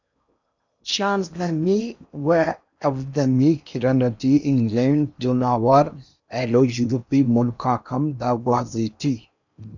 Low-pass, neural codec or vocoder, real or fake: 7.2 kHz; codec, 16 kHz in and 24 kHz out, 0.8 kbps, FocalCodec, streaming, 65536 codes; fake